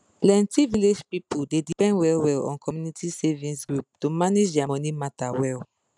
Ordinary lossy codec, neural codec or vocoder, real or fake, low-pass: none; none; real; 10.8 kHz